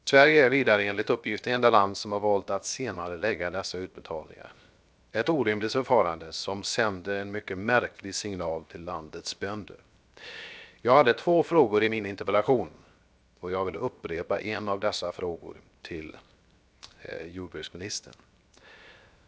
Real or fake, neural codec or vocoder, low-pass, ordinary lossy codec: fake; codec, 16 kHz, 0.7 kbps, FocalCodec; none; none